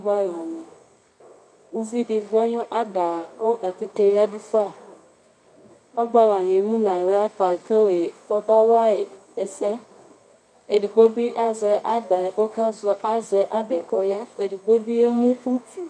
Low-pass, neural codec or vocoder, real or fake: 9.9 kHz; codec, 24 kHz, 0.9 kbps, WavTokenizer, medium music audio release; fake